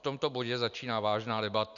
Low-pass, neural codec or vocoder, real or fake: 7.2 kHz; none; real